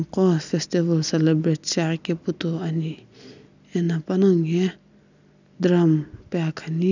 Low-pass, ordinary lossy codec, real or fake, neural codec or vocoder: 7.2 kHz; none; real; none